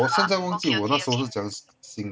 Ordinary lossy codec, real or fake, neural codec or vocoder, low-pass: none; real; none; none